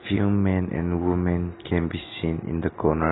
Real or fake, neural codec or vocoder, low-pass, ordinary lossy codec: real; none; 7.2 kHz; AAC, 16 kbps